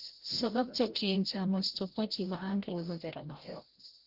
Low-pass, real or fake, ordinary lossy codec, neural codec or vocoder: 5.4 kHz; fake; Opus, 16 kbps; codec, 16 kHz, 0.5 kbps, FreqCodec, larger model